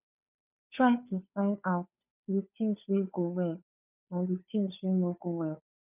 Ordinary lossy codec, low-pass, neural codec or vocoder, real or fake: none; 3.6 kHz; codec, 16 kHz, 2 kbps, FunCodec, trained on Chinese and English, 25 frames a second; fake